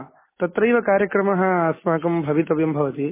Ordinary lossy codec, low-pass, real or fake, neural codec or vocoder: MP3, 16 kbps; 3.6 kHz; real; none